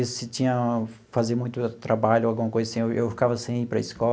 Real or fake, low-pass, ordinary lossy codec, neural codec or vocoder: real; none; none; none